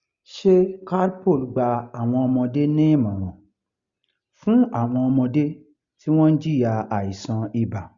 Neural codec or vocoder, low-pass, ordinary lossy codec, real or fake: none; 7.2 kHz; Opus, 64 kbps; real